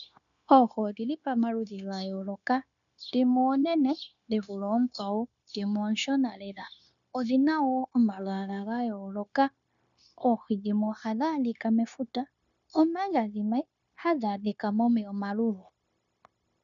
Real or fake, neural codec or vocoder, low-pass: fake; codec, 16 kHz, 0.9 kbps, LongCat-Audio-Codec; 7.2 kHz